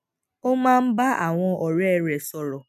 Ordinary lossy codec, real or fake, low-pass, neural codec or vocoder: none; real; 14.4 kHz; none